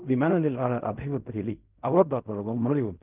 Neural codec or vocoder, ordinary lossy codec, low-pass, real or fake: codec, 16 kHz in and 24 kHz out, 0.4 kbps, LongCat-Audio-Codec, fine tuned four codebook decoder; Opus, 24 kbps; 3.6 kHz; fake